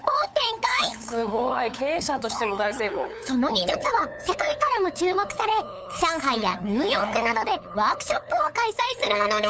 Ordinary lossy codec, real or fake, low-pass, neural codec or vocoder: none; fake; none; codec, 16 kHz, 8 kbps, FunCodec, trained on LibriTTS, 25 frames a second